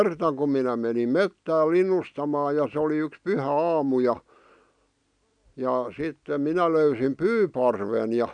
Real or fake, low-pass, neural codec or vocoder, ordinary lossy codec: real; 9.9 kHz; none; none